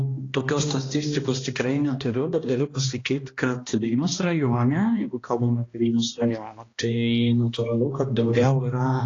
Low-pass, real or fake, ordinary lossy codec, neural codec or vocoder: 7.2 kHz; fake; AAC, 32 kbps; codec, 16 kHz, 1 kbps, X-Codec, HuBERT features, trained on balanced general audio